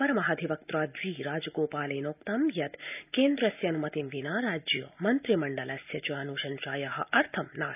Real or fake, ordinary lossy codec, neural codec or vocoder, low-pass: real; none; none; 3.6 kHz